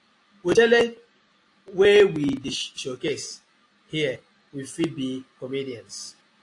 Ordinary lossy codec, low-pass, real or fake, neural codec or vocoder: AAC, 48 kbps; 10.8 kHz; real; none